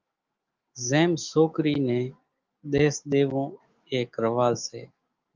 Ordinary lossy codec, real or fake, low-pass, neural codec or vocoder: Opus, 32 kbps; fake; 7.2 kHz; autoencoder, 48 kHz, 128 numbers a frame, DAC-VAE, trained on Japanese speech